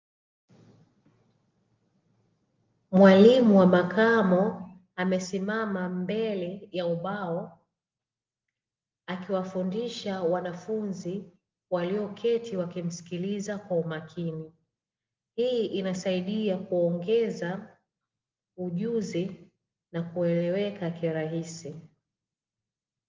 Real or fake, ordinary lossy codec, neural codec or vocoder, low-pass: real; Opus, 32 kbps; none; 7.2 kHz